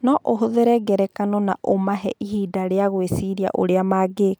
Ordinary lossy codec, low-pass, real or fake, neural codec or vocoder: none; none; real; none